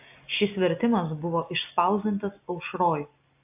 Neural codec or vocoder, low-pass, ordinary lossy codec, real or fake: none; 3.6 kHz; AAC, 32 kbps; real